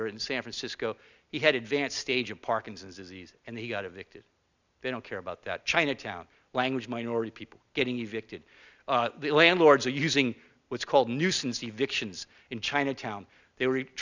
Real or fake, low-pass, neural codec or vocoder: real; 7.2 kHz; none